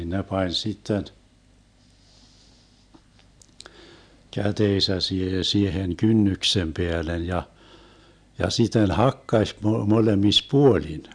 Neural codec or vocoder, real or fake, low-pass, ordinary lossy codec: none; real; 9.9 kHz; none